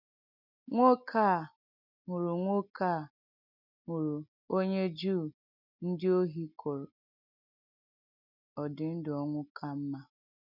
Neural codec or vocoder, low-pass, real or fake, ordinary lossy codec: none; 5.4 kHz; real; none